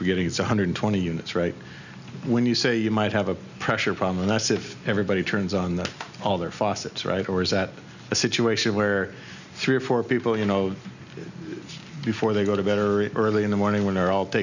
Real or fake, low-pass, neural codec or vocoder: real; 7.2 kHz; none